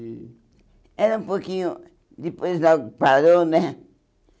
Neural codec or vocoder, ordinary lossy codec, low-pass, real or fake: none; none; none; real